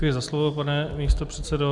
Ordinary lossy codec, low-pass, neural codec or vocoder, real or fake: Opus, 64 kbps; 10.8 kHz; none; real